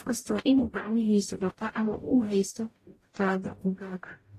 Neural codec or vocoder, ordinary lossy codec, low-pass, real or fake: codec, 44.1 kHz, 0.9 kbps, DAC; AAC, 48 kbps; 14.4 kHz; fake